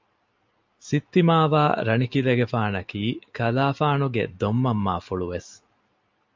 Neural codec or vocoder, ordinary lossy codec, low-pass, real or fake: none; MP3, 64 kbps; 7.2 kHz; real